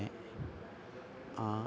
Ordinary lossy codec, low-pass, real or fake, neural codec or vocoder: none; none; real; none